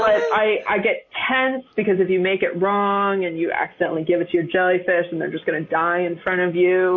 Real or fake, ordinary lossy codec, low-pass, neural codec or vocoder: real; MP3, 32 kbps; 7.2 kHz; none